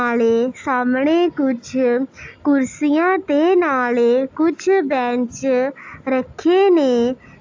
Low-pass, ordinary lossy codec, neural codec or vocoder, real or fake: 7.2 kHz; AAC, 48 kbps; none; real